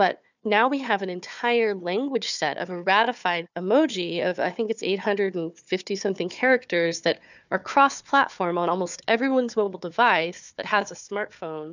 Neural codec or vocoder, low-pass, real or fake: codec, 16 kHz, 4 kbps, FunCodec, trained on Chinese and English, 50 frames a second; 7.2 kHz; fake